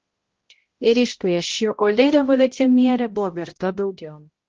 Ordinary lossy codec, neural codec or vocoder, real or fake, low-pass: Opus, 16 kbps; codec, 16 kHz, 0.5 kbps, X-Codec, HuBERT features, trained on balanced general audio; fake; 7.2 kHz